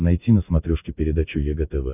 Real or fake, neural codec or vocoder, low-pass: real; none; 3.6 kHz